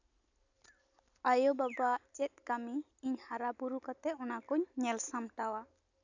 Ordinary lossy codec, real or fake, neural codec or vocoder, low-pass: none; real; none; 7.2 kHz